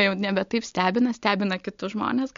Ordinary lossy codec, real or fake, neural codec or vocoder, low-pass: MP3, 48 kbps; real; none; 7.2 kHz